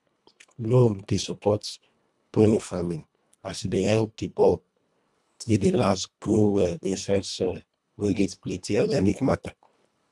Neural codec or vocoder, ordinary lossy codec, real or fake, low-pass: codec, 24 kHz, 1.5 kbps, HILCodec; none; fake; none